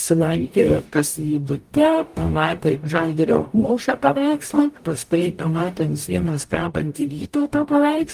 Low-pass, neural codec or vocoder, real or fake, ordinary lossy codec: 14.4 kHz; codec, 44.1 kHz, 0.9 kbps, DAC; fake; Opus, 32 kbps